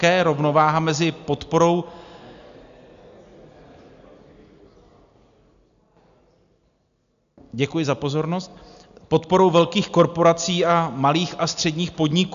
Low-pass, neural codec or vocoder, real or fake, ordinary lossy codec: 7.2 kHz; none; real; AAC, 96 kbps